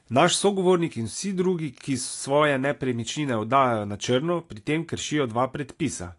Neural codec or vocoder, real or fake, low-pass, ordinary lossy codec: none; real; 10.8 kHz; AAC, 48 kbps